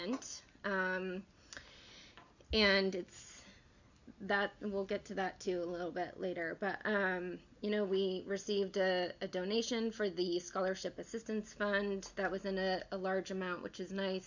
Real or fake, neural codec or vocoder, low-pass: real; none; 7.2 kHz